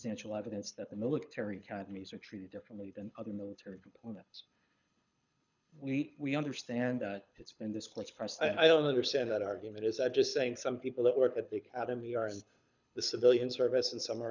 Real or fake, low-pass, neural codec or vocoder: fake; 7.2 kHz; codec, 24 kHz, 6 kbps, HILCodec